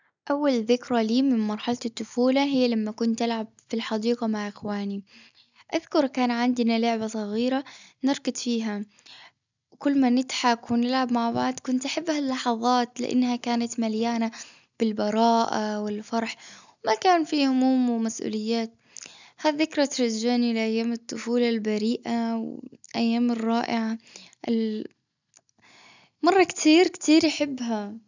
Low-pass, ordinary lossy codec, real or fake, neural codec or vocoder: 7.2 kHz; none; real; none